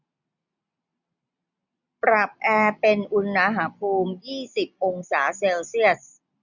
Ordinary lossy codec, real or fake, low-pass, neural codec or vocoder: none; real; 7.2 kHz; none